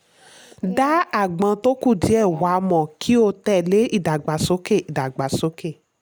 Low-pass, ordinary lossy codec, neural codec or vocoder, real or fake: none; none; none; real